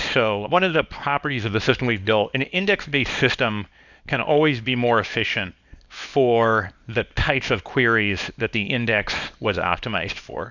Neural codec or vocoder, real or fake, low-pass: codec, 24 kHz, 0.9 kbps, WavTokenizer, small release; fake; 7.2 kHz